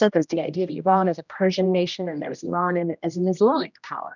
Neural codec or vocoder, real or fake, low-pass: codec, 16 kHz, 1 kbps, X-Codec, HuBERT features, trained on general audio; fake; 7.2 kHz